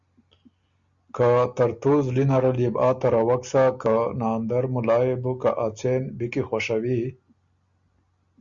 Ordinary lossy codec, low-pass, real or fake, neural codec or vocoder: Opus, 64 kbps; 7.2 kHz; real; none